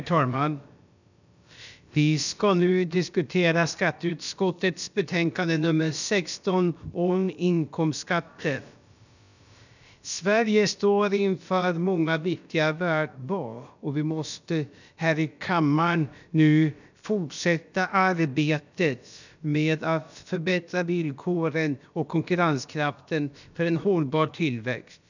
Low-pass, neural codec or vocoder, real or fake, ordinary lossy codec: 7.2 kHz; codec, 16 kHz, about 1 kbps, DyCAST, with the encoder's durations; fake; none